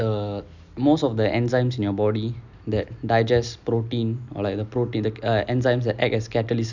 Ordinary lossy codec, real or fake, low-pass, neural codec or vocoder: none; real; 7.2 kHz; none